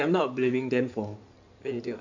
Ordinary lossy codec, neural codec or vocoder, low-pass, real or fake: none; codec, 16 kHz in and 24 kHz out, 2.2 kbps, FireRedTTS-2 codec; 7.2 kHz; fake